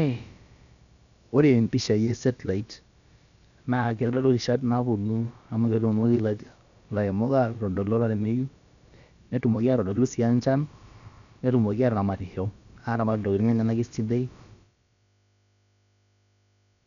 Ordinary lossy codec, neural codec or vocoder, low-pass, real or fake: MP3, 96 kbps; codec, 16 kHz, about 1 kbps, DyCAST, with the encoder's durations; 7.2 kHz; fake